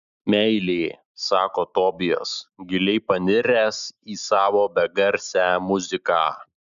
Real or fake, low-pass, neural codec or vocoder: real; 7.2 kHz; none